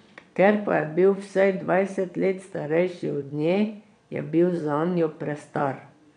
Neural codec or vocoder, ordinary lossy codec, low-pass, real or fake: vocoder, 22.05 kHz, 80 mel bands, Vocos; none; 9.9 kHz; fake